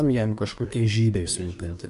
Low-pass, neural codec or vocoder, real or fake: 10.8 kHz; codec, 24 kHz, 1 kbps, SNAC; fake